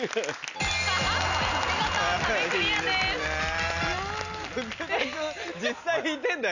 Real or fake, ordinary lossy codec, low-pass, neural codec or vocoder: real; none; 7.2 kHz; none